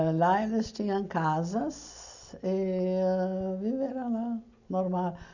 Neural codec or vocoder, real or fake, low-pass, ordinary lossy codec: none; real; 7.2 kHz; none